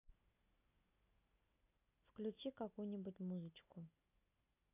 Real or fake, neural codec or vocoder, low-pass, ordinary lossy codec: real; none; 3.6 kHz; AAC, 24 kbps